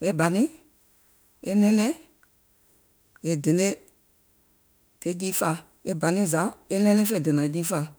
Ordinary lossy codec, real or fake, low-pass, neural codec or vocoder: none; fake; none; autoencoder, 48 kHz, 32 numbers a frame, DAC-VAE, trained on Japanese speech